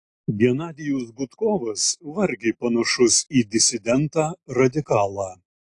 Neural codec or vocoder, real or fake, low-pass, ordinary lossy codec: none; real; 10.8 kHz; AAC, 48 kbps